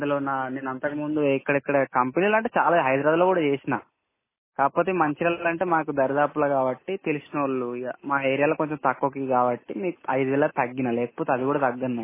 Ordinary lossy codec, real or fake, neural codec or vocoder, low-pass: MP3, 16 kbps; real; none; 3.6 kHz